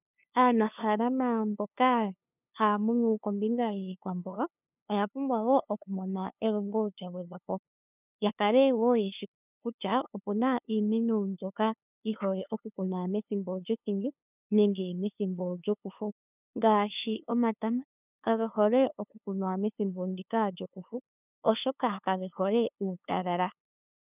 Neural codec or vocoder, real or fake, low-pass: codec, 16 kHz, 2 kbps, FunCodec, trained on LibriTTS, 25 frames a second; fake; 3.6 kHz